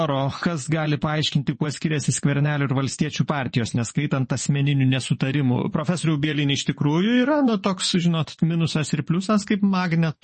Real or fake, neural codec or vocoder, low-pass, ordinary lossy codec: fake; vocoder, 24 kHz, 100 mel bands, Vocos; 10.8 kHz; MP3, 32 kbps